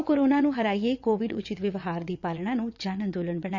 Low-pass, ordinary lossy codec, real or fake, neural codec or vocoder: 7.2 kHz; none; fake; vocoder, 22.05 kHz, 80 mel bands, WaveNeXt